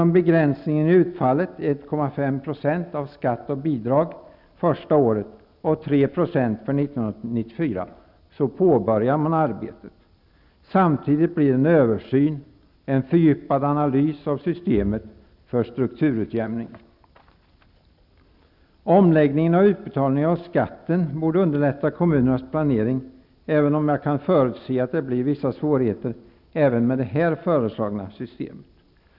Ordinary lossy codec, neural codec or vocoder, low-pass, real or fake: none; none; 5.4 kHz; real